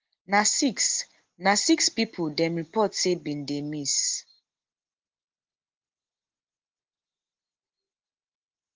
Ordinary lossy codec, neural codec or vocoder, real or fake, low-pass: Opus, 16 kbps; none; real; 7.2 kHz